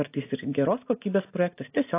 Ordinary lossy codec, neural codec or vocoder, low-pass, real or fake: AAC, 24 kbps; none; 3.6 kHz; real